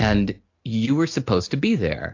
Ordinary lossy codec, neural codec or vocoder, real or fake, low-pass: AAC, 48 kbps; none; real; 7.2 kHz